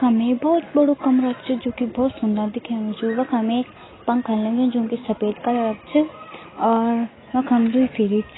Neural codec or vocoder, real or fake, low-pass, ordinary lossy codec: none; real; 7.2 kHz; AAC, 16 kbps